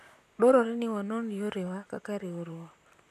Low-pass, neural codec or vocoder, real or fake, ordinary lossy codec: 14.4 kHz; vocoder, 44.1 kHz, 128 mel bands, Pupu-Vocoder; fake; none